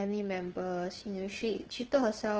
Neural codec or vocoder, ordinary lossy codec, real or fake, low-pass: codec, 16 kHz, 8 kbps, FunCodec, trained on LibriTTS, 25 frames a second; Opus, 16 kbps; fake; 7.2 kHz